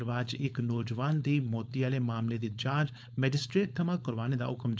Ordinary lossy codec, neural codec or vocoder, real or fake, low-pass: none; codec, 16 kHz, 4.8 kbps, FACodec; fake; none